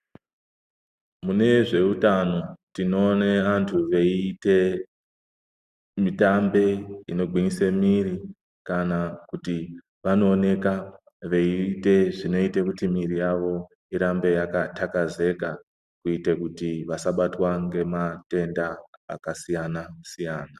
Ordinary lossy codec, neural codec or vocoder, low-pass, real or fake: Opus, 64 kbps; none; 14.4 kHz; real